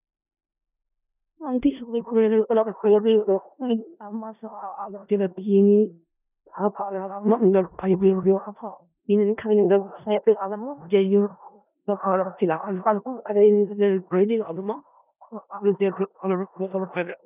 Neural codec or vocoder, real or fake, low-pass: codec, 16 kHz in and 24 kHz out, 0.4 kbps, LongCat-Audio-Codec, four codebook decoder; fake; 3.6 kHz